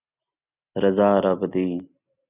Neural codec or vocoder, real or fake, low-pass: none; real; 3.6 kHz